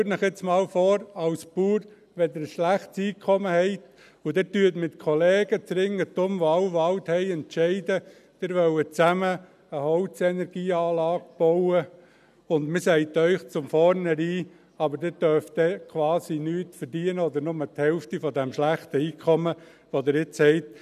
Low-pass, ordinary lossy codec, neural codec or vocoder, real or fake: 14.4 kHz; none; none; real